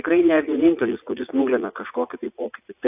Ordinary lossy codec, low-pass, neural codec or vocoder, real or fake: AAC, 32 kbps; 3.6 kHz; vocoder, 44.1 kHz, 80 mel bands, Vocos; fake